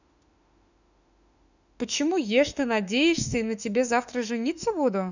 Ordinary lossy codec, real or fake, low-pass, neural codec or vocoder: none; fake; 7.2 kHz; autoencoder, 48 kHz, 32 numbers a frame, DAC-VAE, trained on Japanese speech